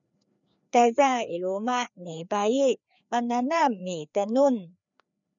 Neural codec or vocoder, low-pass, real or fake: codec, 16 kHz, 2 kbps, FreqCodec, larger model; 7.2 kHz; fake